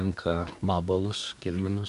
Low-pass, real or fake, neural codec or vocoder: 10.8 kHz; fake; codec, 24 kHz, 1 kbps, SNAC